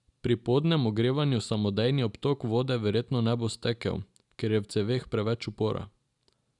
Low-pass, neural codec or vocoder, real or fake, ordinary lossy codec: 10.8 kHz; none; real; none